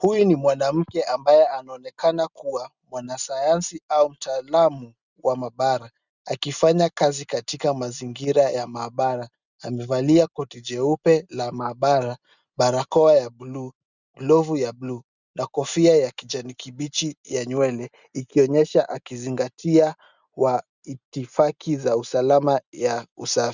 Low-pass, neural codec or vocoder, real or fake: 7.2 kHz; none; real